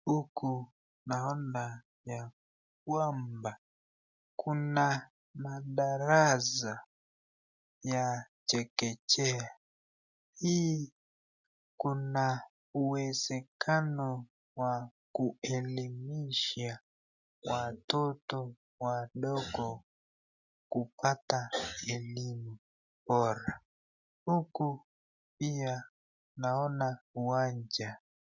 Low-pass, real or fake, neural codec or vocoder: 7.2 kHz; real; none